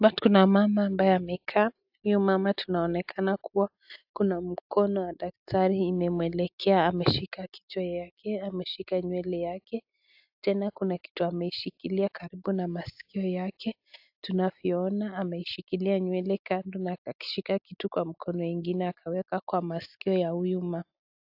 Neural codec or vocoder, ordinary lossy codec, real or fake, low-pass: none; AAC, 48 kbps; real; 5.4 kHz